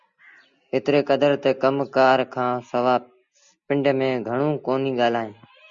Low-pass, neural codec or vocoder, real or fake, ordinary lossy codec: 7.2 kHz; none; real; Opus, 64 kbps